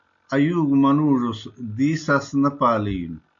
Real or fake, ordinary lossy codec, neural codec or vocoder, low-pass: real; MP3, 64 kbps; none; 7.2 kHz